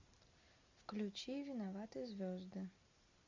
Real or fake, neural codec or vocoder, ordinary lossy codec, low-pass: real; none; MP3, 64 kbps; 7.2 kHz